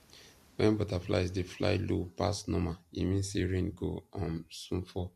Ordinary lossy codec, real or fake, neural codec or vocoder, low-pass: MP3, 64 kbps; fake; vocoder, 44.1 kHz, 128 mel bands every 256 samples, BigVGAN v2; 14.4 kHz